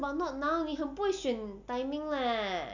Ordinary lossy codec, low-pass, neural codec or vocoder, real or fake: none; 7.2 kHz; none; real